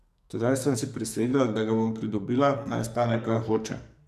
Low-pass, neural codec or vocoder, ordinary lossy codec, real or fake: 14.4 kHz; codec, 44.1 kHz, 2.6 kbps, SNAC; none; fake